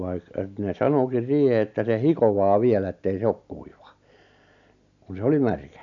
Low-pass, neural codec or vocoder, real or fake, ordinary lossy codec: 7.2 kHz; none; real; none